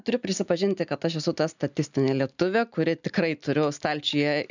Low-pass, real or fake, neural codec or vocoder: 7.2 kHz; real; none